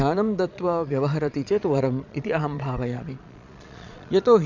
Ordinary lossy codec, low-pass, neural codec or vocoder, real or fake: none; 7.2 kHz; vocoder, 22.05 kHz, 80 mel bands, Vocos; fake